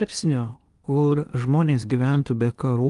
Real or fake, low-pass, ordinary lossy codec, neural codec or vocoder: fake; 10.8 kHz; Opus, 32 kbps; codec, 16 kHz in and 24 kHz out, 0.8 kbps, FocalCodec, streaming, 65536 codes